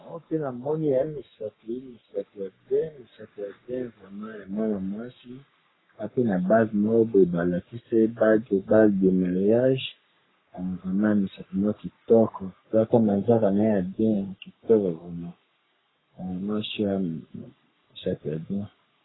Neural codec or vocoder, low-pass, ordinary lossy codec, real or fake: codec, 44.1 kHz, 3.4 kbps, Pupu-Codec; 7.2 kHz; AAC, 16 kbps; fake